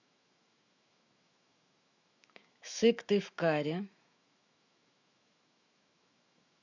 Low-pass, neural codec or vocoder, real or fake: 7.2 kHz; autoencoder, 48 kHz, 128 numbers a frame, DAC-VAE, trained on Japanese speech; fake